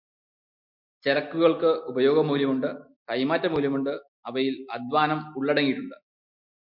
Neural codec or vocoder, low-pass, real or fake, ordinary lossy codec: none; 5.4 kHz; real; MP3, 48 kbps